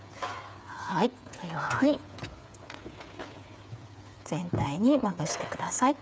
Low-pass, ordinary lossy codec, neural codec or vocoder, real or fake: none; none; codec, 16 kHz, 8 kbps, FreqCodec, smaller model; fake